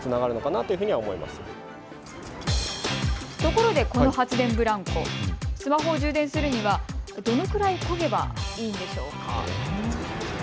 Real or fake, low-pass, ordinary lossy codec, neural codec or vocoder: real; none; none; none